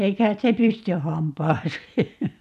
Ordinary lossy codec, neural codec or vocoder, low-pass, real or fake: none; vocoder, 44.1 kHz, 128 mel bands every 256 samples, BigVGAN v2; 14.4 kHz; fake